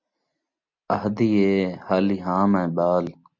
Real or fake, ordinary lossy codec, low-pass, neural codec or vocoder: real; MP3, 64 kbps; 7.2 kHz; none